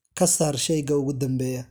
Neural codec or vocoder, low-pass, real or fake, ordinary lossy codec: none; none; real; none